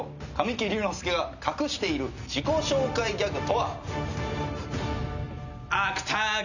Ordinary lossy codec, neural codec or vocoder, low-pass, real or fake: none; none; 7.2 kHz; real